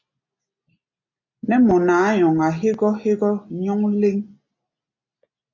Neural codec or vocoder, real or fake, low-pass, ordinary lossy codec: none; real; 7.2 kHz; MP3, 48 kbps